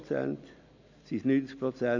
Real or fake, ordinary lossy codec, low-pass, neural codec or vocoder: real; none; 7.2 kHz; none